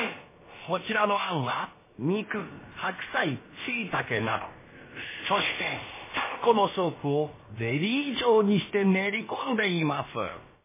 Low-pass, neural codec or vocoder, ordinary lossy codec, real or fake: 3.6 kHz; codec, 16 kHz, about 1 kbps, DyCAST, with the encoder's durations; MP3, 16 kbps; fake